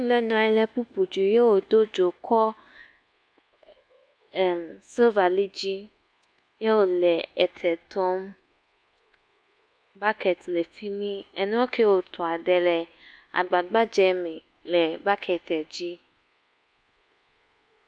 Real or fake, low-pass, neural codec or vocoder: fake; 9.9 kHz; codec, 24 kHz, 1.2 kbps, DualCodec